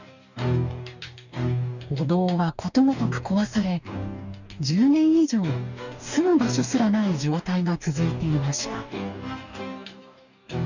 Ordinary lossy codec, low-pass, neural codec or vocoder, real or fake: none; 7.2 kHz; codec, 44.1 kHz, 2.6 kbps, DAC; fake